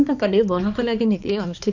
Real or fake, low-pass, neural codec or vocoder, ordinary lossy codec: fake; 7.2 kHz; codec, 16 kHz, 2 kbps, X-Codec, HuBERT features, trained on balanced general audio; none